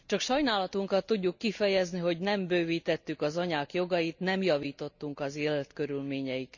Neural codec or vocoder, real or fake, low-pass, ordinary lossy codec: none; real; 7.2 kHz; none